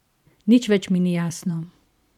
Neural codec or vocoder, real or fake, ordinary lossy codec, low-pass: vocoder, 44.1 kHz, 128 mel bands every 512 samples, BigVGAN v2; fake; none; 19.8 kHz